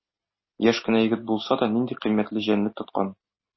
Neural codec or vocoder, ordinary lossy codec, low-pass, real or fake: none; MP3, 24 kbps; 7.2 kHz; real